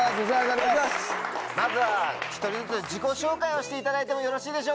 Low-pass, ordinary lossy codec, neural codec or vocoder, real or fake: none; none; none; real